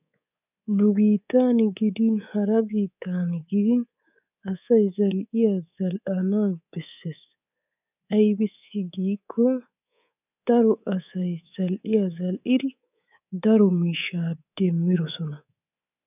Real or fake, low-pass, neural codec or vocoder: fake; 3.6 kHz; codec, 24 kHz, 3.1 kbps, DualCodec